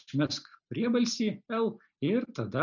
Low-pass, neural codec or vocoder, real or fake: 7.2 kHz; none; real